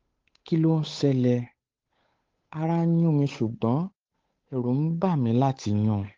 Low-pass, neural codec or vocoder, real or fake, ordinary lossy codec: 7.2 kHz; codec, 16 kHz, 8 kbps, FunCodec, trained on Chinese and English, 25 frames a second; fake; Opus, 32 kbps